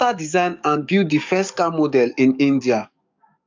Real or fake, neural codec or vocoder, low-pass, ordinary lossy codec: fake; codec, 44.1 kHz, 7.8 kbps, DAC; 7.2 kHz; MP3, 64 kbps